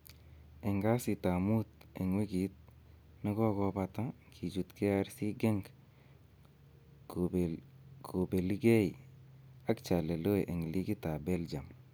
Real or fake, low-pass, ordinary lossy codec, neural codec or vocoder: real; none; none; none